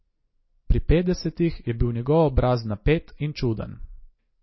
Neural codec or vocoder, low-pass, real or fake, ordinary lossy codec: none; 7.2 kHz; real; MP3, 24 kbps